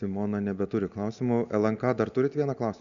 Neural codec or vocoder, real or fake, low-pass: none; real; 7.2 kHz